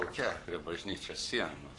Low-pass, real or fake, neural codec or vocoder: 10.8 kHz; fake; codec, 44.1 kHz, 7.8 kbps, Pupu-Codec